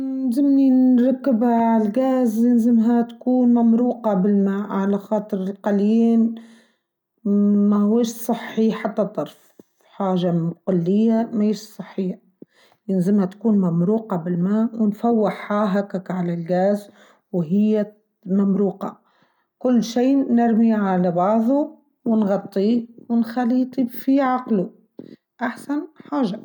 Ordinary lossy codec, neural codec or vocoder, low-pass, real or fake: none; none; 19.8 kHz; real